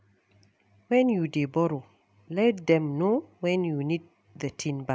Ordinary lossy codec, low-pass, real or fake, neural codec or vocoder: none; none; real; none